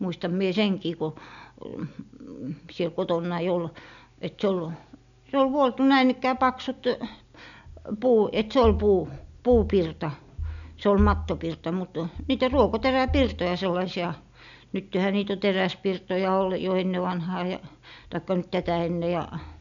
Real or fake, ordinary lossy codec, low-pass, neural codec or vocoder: real; none; 7.2 kHz; none